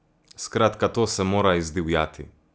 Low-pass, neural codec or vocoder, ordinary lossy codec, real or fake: none; none; none; real